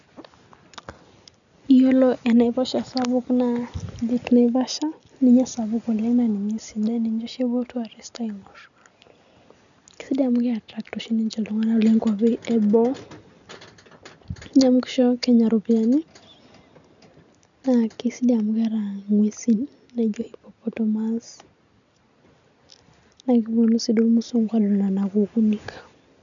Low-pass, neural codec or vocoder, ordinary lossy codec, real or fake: 7.2 kHz; none; none; real